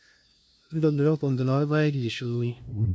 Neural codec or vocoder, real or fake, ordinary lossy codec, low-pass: codec, 16 kHz, 0.5 kbps, FunCodec, trained on LibriTTS, 25 frames a second; fake; none; none